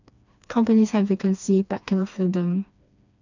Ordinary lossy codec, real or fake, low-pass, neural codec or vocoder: none; fake; 7.2 kHz; codec, 16 kHz, 2 kbps, FreqCodec, smaller model